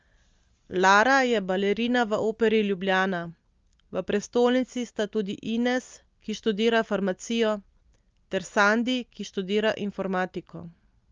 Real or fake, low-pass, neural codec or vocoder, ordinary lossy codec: real; 7.2 kHz; none; Opus, 32 kbps